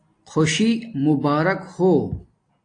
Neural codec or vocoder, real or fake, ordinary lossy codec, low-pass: none; real; AAC, 48 kbps; 9.9 kHz